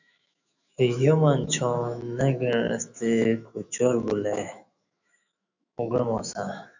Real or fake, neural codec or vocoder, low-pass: fake; autoencoder, 48 kHz, 128 numbers a frame, DAC-VAE, trained on Japanese speech; 7.2 kHz